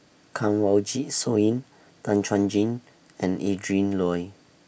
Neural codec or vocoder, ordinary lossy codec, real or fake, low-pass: none; none; real; none